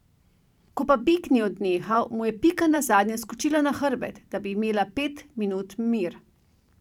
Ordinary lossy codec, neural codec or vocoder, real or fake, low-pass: none; vocoder, 44.1 kHz, 128 mel bands every 512 samples, BigVGAN v2; fake; 19.8 kHz